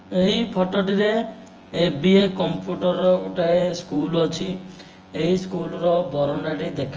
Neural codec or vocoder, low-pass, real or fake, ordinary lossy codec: vocoder, 24 kHz, 100 mel bands, Vocos; 7.2 kHz; fake; Opus, 24 kbps